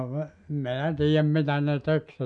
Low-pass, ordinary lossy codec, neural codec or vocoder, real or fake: 9.9 kHz; none; none; real